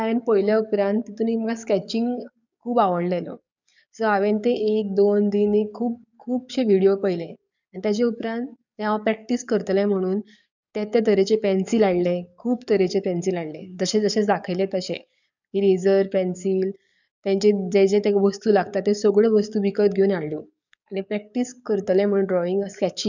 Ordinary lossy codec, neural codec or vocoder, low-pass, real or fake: none; codec, 44.1 kHz, 7.8 kbps, DAC; 7.2 kHz; fake